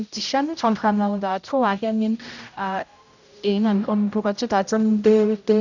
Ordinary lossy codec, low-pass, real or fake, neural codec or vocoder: none; 7.2 kHz; fake; codec, 16 kHz, 0.5 kbps, X-Codec, HuBERT features, trained on general audio